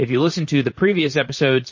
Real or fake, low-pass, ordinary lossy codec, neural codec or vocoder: fake; 7.2 kHz; MP3, 32 kbps; vocoder, 44.1 kHz, 128 mel bands, Pupu-Vocoder